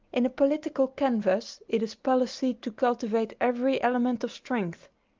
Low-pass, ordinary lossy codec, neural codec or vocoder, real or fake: 7.2 kHz; Opus, 24 kbps; none; real